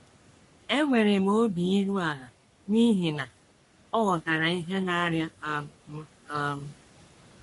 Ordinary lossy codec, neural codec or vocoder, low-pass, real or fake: MP3, 48 kbps; codec, 44.1 kHz, 3.4 kbps, Pupu-Codec; 14.4 kHz; fake